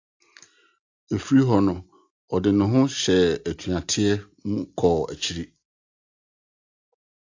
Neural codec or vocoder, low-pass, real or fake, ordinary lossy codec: none; 7.2 kHz; real; AAC, 48 kbps